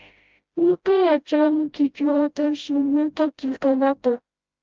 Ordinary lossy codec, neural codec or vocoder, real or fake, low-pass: Opus, 24 kbps; codec, 16 kHz, 0.5 kbps, FreqCodec, smaller model; fake; 7.2 kHz